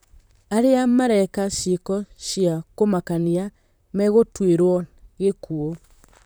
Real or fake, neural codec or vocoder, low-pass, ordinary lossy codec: fake; vocoder, 44.1 kHz, 128 mel bands, Pupu-Vocoder; none; none